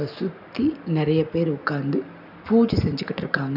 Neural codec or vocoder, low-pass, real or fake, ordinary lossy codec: none; 5.4 kHz; real; none